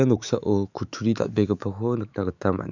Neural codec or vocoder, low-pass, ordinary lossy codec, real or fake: autoencoder, 48 kHz, 128 numbers a frame, DAC-VAE, trained on Japanese speech; 7.2 kHz; none; fake